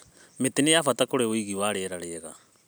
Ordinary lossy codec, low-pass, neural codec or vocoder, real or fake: none; none; none; real